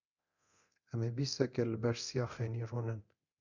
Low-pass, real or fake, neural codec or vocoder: 7.2 kHz; fake; codec, 24 kHz, 0.9 kbps, DualCodec